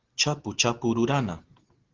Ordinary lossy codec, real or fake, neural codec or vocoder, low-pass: Opus, 16 kbps; real; none; 7.2 kHz